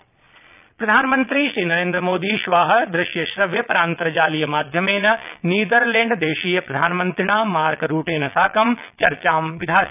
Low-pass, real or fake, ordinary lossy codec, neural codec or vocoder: 3.6 kHz; fake; none; vocoder, 22.05 kHz, 80 mel bands, Vocos